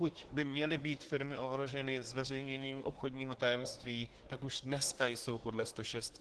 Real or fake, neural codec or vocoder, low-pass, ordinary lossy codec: fake; codec, 24 kHz, 1 kbps, SNAC; 10.8 kHz; Opus, 16 kbps